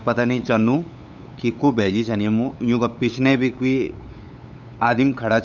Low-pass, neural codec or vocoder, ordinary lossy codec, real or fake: 7.2 kHz; codec, 16 kHz, 8 kbps, FunCodec, trained on LibriTTS, 25 frames a second; none; fake